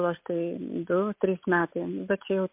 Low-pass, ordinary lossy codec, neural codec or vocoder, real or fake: 3.6 kHz; MP3, 32 kbps; none; real